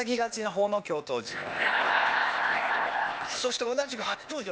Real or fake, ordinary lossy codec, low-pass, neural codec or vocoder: fake; none; none; codec, 16 kHz, 0.8 kbps, ZipCodec